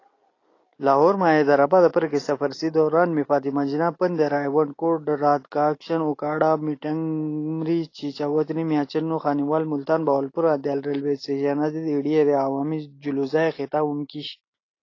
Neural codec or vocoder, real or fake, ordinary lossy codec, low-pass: none; real; AAC, 32 kbps; 7.2 kHz